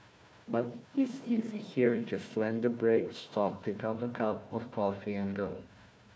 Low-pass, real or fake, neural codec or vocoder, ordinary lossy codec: none; fake; codec, 16 kHz, 1 kbps, FunCodec, trained on Chinese and English, 50 frames a second; none